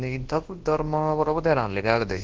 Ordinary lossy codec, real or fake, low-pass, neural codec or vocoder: Opus, 16 kbps; fake; 7.2 kHz; codec, 24 kHz, 0.9 kbps, WavTokenizer, large speech release